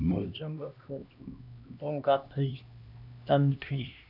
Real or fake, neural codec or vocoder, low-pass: fake; codec, 16 kHz, 1 kbps, X-Codec, HuBERT features, trained on LibriSpeech; 5.4 kHz